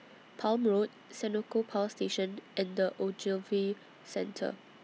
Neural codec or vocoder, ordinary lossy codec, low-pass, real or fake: none; none; none; real